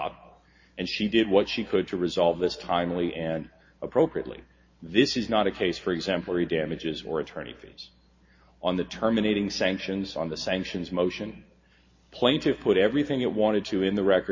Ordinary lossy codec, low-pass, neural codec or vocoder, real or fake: MP3, 32 kbps; 7.2 kHz; none; real